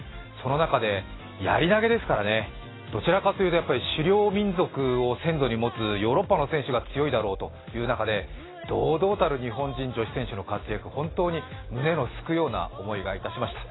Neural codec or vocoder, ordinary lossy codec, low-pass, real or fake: none; AAC, 16 kbps; 7.2 kHz; real